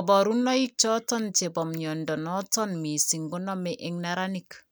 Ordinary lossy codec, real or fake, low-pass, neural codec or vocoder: none; real; none; none